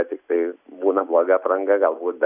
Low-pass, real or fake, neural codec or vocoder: 3.6 kHz; real; none